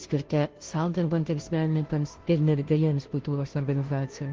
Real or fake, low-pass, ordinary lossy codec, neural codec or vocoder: fake; 7.2 kHz; Opus, 16 kbps; codec, 16 kHz, 0.5 kbps, FunCodec, trained on Chinese and English, 25 frames a second